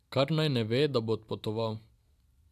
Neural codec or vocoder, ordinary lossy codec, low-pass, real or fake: none; AAC, 96 kbps; 14.4 kHz; real